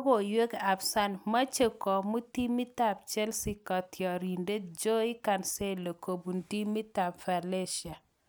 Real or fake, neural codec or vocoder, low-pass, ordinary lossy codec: real; none; none; none